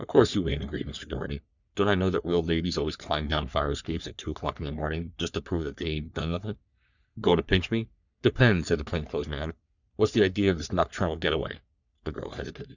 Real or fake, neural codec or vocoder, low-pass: fake; codec, 44.1 kHz, 3.4 kbps, Pupu-Codec; 7.2 kHz